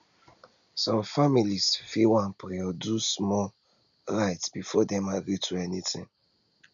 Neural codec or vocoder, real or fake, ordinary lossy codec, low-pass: none; real; none; 7.2 kHz